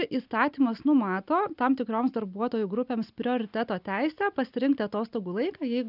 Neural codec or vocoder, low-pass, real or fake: none; 5.4 kHz; real